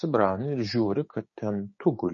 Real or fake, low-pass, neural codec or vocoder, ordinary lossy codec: fake; 7.2 kHz; codec, 16 kHz, 6 kbps, DAC; MP3, 32 kbps